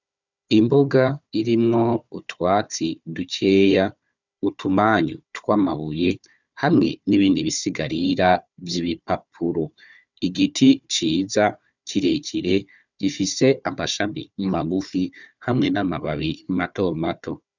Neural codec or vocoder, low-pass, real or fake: codec, 16 kHz, 4 kbps, FunCodec, trained on Chinese and English, 50 frames a second; 7.2 kHz; fake